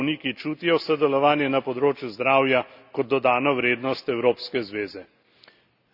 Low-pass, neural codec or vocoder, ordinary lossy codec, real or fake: 5.4 kHz; none; none; real